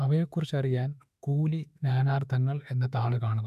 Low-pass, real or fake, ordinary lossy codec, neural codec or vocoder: 14.4 kHz; fake; none; autoencoder, 48 kHz, 32 numbers a frame, DAC-VAE, trained on Japanese speech